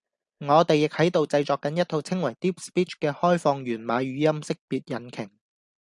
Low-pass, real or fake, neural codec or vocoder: 10.8 kHz; real; none